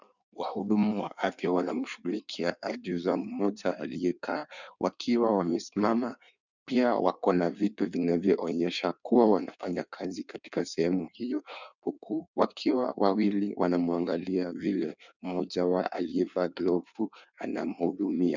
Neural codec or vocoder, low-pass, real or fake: codec, 16 kHz in and 24 kHz out, 1.1 kbps, FireRedTTS-2 codec; 7.2 kHz; fake